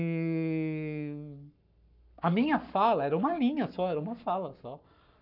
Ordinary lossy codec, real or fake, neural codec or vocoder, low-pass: none; fake; codec, 44.1 kHz, 7.8 kbps, Pupu-Codec; 5.4 kHz